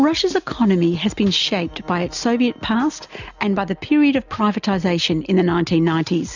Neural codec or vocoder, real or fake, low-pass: none; real; 7.2 kHz